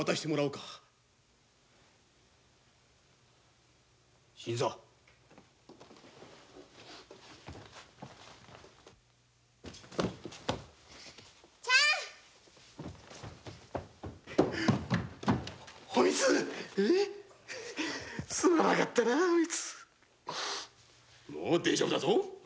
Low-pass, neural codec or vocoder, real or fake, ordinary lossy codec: none; none; real; none